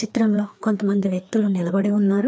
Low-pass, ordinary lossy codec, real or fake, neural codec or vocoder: none; none; fake; codec, 16 kHz, 2 kbps, FreqCodec, larger model